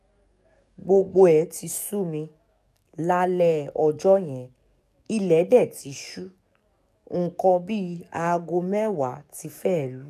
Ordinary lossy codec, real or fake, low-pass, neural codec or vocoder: none; fake; 14.4 kHz; codec, 44.1 kHz, 7.8 kbps, DAC